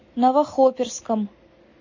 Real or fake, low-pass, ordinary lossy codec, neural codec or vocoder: real; 7.2 kHz; MP3, 32 kbps; none